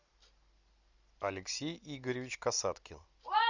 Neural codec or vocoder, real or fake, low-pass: none; real; 7.2 kHz